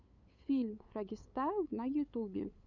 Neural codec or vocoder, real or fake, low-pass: codec, 16 kHz, 8 kbps, FunCodec, trained on LibriTTS, 25 frames a second; fake; 7.2 kHz